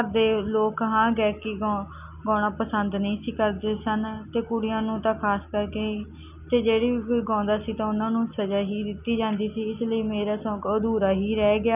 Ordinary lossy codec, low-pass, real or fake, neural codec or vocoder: none; 3.6 kHz; real; none